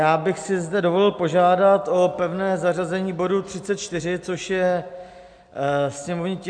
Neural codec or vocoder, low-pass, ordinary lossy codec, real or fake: none; 9.9 kHz; MP3, 64 kbps; real